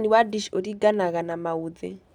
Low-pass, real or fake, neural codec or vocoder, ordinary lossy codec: 19.8 kHz; real; none; none